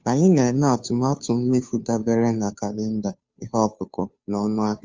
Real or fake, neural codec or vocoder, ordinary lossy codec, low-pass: fake; codec, 16 kHz, 2 kbps, FunCodec, trained on Chinese and English, 25 frames a second; Opus, 32 kbps; 7.2 kHz